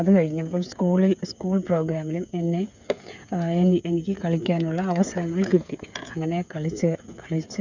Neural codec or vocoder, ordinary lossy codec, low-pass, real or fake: codec, 16 kHz, 8 kbps, FreqCodec, smaller model; none; 7.2 kHz; fake